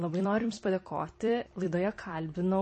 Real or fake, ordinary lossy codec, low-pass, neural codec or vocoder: fake; MP3, 32 kbps; 10.8 kHz; vocoder, 44.1 kHz, 128 mel bands every 256 samples, BigVGAN v2